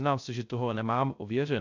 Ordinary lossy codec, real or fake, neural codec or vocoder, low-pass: AAC, 48 kbps; fake; codec, 16 kHz, 0.3 kbps, FocalCodec; 7.2 kHz